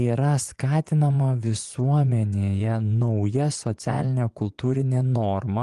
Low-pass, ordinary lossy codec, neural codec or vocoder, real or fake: 10.8 kHz; Opus, 24 kbps; vocoder, 24 kHz, 100 mel bands, Vocos; fake